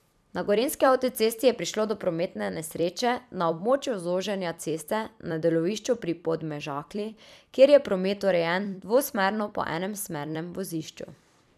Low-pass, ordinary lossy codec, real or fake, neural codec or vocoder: 14.4 kHz; none; fake; vocoder, 44.1 kHz, 128 mel bands every 256 samples, BigVGAN v2